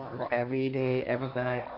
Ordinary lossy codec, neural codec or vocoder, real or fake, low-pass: none; codec, 16 kHz, 1.1 kbps, Voila-Tokenizer; fake; 5.4 kHz